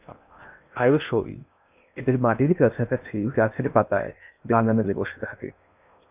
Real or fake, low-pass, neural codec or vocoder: fake; 3.6 kHz; codec, 16 kHz in and 24 kHz out, 0.6 kbps, FocalCodec, streaming, 2048 codes